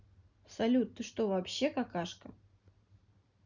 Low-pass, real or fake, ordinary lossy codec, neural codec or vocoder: 7.2 kHz; real; none; none